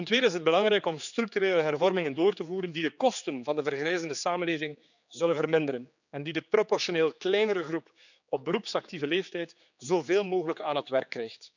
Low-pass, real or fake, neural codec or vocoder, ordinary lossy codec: 7.2 kHz; fake; codec, 16 kHz, 4 kbps, X-Codec, HuBERT features, trained on general audio; none